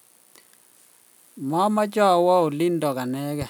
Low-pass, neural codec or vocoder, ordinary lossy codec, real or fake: none; none; none; real